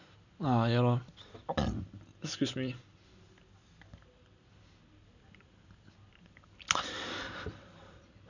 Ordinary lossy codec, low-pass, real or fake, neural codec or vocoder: none; 7.2 kHz; real; none